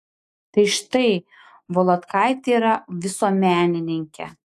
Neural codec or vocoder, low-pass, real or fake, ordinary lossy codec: autoencoder, 48 kHz, 128 numbers a frame, DAC-VAE, trained on Japanese speech; 14.4 kHz; fake; AAC, 64 kbps